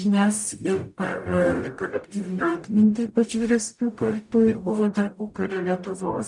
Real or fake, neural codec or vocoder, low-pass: fake; codec, 44.1 kHz, 0.9 kbps, DAC; 10.8 kHz